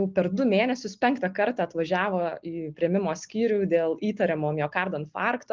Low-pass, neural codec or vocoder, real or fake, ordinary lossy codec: 7.2 kHz; none; real; Opus, 32 kbps